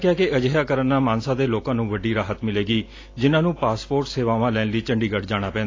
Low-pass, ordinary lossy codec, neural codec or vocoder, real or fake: 7.2 kHz; AAC, 32 kbps; none; real